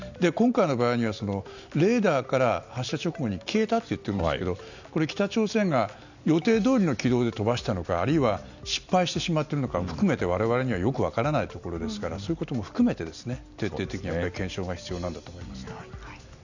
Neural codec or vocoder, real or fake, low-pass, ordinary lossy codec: none; real; 7.2 kHz; none